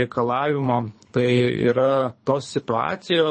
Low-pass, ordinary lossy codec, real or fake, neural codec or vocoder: 9.9 kHz; MP3, 32 kbps; fake; codec, 24 kHz, 3 kbps, HILCodec